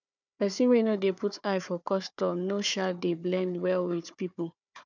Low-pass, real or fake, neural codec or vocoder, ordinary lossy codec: 7.2 kHz; fake; codec, 16 kHz, 4 kbps, FunCodec, trained on Chinese and English, 50 frames a second; none